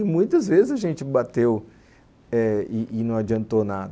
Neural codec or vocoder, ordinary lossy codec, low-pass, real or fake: none; none; none; real